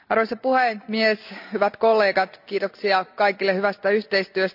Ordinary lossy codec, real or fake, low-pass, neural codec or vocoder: none; real; 5.4 kHz; none